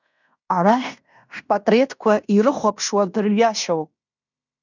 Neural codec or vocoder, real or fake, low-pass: codec, 16 kHz in and 24 kHz out, 0.9 kbps, LongCat-Audio-Codec, fine tuned four codebook decoder; fake; 7.2 kHz